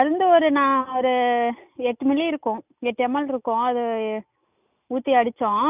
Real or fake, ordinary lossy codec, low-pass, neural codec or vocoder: real; none; 3.6 kHz; none